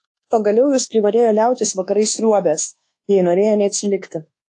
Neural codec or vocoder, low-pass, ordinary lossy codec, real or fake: codec, 24 kHz, 1.2 kbps, DualCodec; 10.8 kHz; AAC, 48 kbps; fake